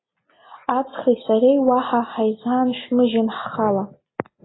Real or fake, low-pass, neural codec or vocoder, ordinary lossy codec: real; 7.2 kHz; none; AAC, 16 kbps